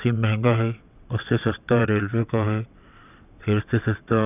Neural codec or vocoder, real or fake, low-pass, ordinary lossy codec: vocoder, 22.05 kHz, 80 mel bands, WaveNeXt; fake; 3.6 kHz; none